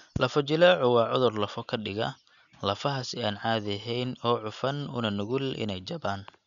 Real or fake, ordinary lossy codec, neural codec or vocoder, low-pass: real; none; none; 7.2 kHz